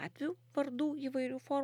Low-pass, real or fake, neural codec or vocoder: 19.8 kHz; real; none